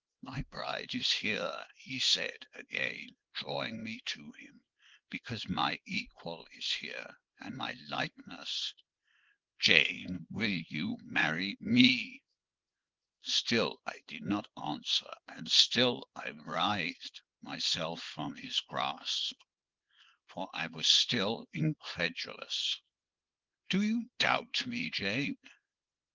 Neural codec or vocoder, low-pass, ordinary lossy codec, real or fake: codec, 24 kHz, 0.9 kbps, WavTokenizer, small release; 7.2 kHz; Opus, 16 kbps; fake